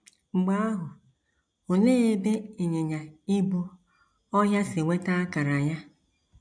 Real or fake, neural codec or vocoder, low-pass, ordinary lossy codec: real; none; 9.9 kHz; none